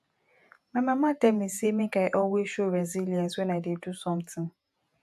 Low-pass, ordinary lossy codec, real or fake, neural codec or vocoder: 14.4 kHz; AAC, 96 kbps; fake; vocoder, 48 kHz, 128 mel bands, Vocos